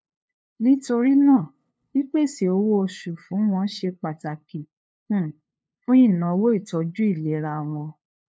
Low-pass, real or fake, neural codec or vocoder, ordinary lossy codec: none; fake; codec, 16 kHz, 2 kbps, FunCodec, trained on LibriTTS, 25 frames a second; none